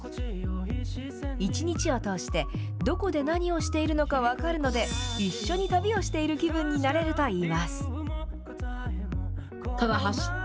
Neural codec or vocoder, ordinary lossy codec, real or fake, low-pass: none; none; real; none